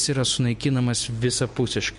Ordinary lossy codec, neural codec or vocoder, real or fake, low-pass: MP3, 48 kbps; none; real; 10.8 kHz